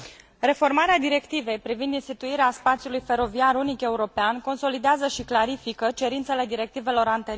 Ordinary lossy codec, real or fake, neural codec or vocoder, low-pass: none; real; none; none